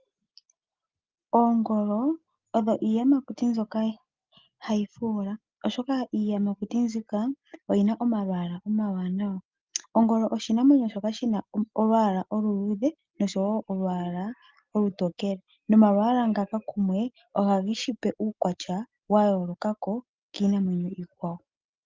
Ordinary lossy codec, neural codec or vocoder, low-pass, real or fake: Opus, 32 kbps; none; 7.2 kHz; real